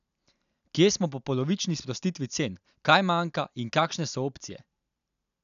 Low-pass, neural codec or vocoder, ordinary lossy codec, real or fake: 7.2 kHz; none; none; real